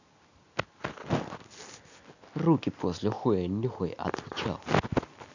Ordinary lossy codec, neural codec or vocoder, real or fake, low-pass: none; none; real; 7.2 kHz